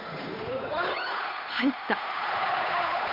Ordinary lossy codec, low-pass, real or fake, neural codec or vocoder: MP3, 48 kbps; 5.4 kHz; fake; codec, 16 kHz, 8 kbps, FunCodec, trained on Chinese and English, 25 frames a second